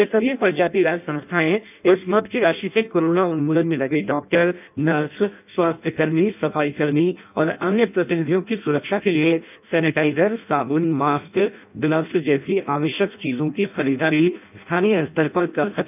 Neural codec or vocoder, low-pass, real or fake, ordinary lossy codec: codec, 16 kHz in and 24 kHz out, 0.6 kbps, FireRedTTS-2 codec; 3.6 kHz; fake; none